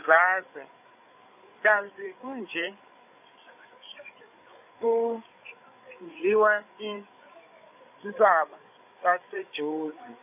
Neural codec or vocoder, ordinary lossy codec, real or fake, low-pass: codec, 16 kHz, 8 kbps, FreqCodec, larger model; AAC, 32 kbps; fake; 3.6 kHz